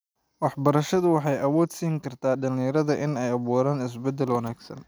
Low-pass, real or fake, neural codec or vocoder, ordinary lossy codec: none; real; none; none